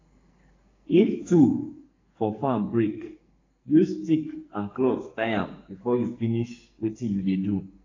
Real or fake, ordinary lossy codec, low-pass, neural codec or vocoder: fake; AAC, 32 kbps; 7.2 kHz; codec, 44.1 kHz, 2.6 kbps, SNAC